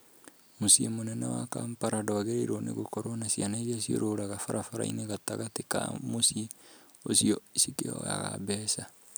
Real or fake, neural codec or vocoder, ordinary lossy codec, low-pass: real; none; none; none